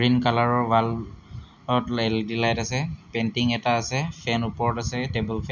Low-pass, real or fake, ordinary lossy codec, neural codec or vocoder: 7.2 kHz; real; none; none